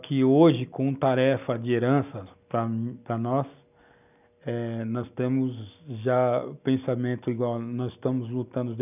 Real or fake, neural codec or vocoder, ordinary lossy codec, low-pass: real; none; none; 3.6 kHz